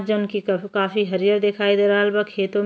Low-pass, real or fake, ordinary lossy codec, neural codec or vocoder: none; real; none; none